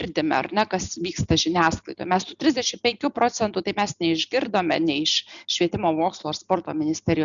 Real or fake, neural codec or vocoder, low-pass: real; none; 7.2 kHz